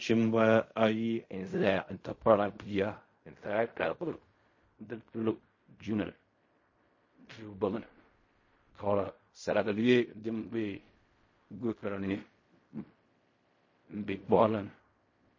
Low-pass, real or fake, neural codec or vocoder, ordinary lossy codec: 7.2 kHz; fake; codec, 16 kHz in and 24 kHz out, 0.4 kbps, LongCat-Audio-Codec, fine tuned four codebook decoder; MP3, 32 kbps